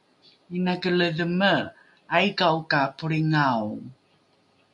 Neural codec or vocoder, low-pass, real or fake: none; 10.8 kHz; real